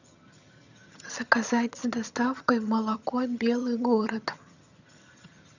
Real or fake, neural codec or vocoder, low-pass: fake; vocoder, 22.05 kHz, 80 mel bands, HiFi-GAN; 7.2 kHz